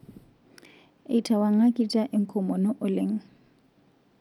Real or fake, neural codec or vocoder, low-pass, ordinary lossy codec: real; none; 19.8 kHz; none